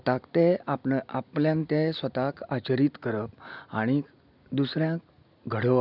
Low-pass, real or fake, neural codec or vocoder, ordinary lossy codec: 5.4 kHz; real; none; none